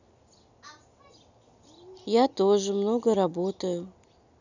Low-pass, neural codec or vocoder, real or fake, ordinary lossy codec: 7.2 kHz; none; real; none